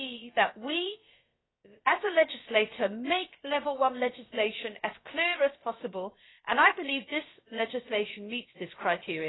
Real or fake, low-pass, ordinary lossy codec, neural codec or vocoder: fake; 7.2 kHz; AAC, 16 kbps; codec, 16 kHz, about 1 kbps, DyCAST, with the encoder's durations